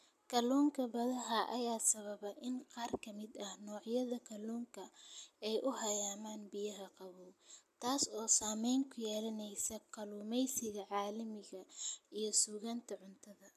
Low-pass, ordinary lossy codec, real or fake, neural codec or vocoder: 14.4 kHz; none; real; none